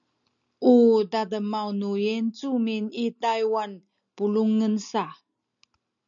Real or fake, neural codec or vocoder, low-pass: real; none; 7.2 kHz